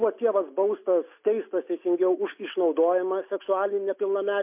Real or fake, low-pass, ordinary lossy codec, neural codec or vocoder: real; 3.6 kHz; MP3, 32 kbps; none